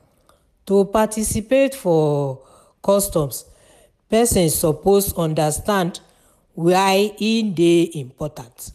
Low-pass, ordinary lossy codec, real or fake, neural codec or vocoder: 14.4 kHz; none; real; none